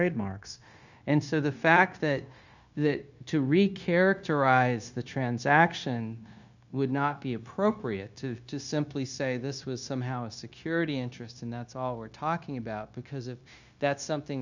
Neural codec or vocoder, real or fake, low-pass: codec, 16 kHz, 0.9 kbps, LongCat-Audio-Codec; fake; 7.2 kHz